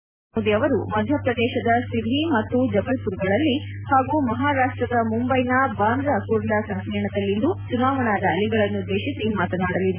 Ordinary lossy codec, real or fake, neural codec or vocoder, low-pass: none; real; none; 3.6 kHz